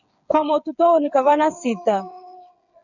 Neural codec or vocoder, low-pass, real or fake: codec, 16 kHz, 8 kbps, FreqCodec, smaller model; 7.2 kHz; fake